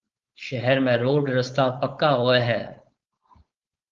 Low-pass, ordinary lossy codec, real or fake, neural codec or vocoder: 7.2 kHz; Opus, 32 kbps; fake; codec, 16 kHz, 4.8 kbps, FACodec